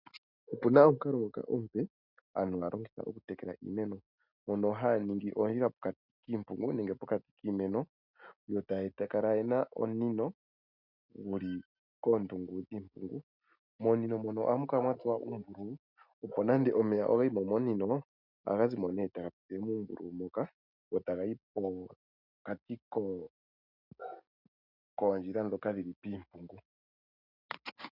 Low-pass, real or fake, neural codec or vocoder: 5.4 kHz; real; none